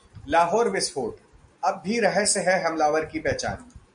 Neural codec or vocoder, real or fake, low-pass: none; real; 9.9 kHz